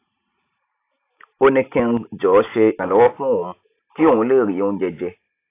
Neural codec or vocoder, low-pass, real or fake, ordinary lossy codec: none; 3.6 kHz; real; AAC, 24 kbps